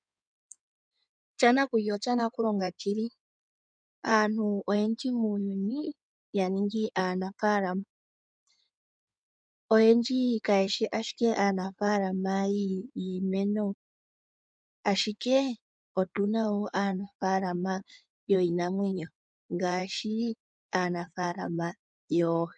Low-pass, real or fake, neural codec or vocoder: 9.9 kHz; fake; codec, 16 kHz in and 24 kHz out, 2.2 kbps, FireRedTTS-2 codec